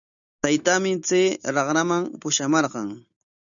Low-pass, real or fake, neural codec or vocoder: 7.2 kHz; real; none